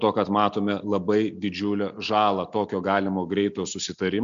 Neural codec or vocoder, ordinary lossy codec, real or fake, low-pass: none; MP3, 64 kbps; real; 7.2 kHz